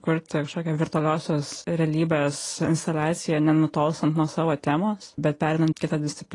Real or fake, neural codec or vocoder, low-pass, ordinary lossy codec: real; none; 10.8 kHz; AAC, 32 kbps